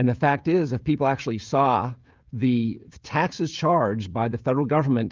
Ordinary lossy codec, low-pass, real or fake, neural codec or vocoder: Opus, 32 kbps; 7.2 kHz; fake; codec, 16 kHz, 16 kbps, FreqCodec, smaller model